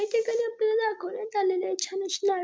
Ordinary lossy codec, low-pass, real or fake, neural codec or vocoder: none; none; real; none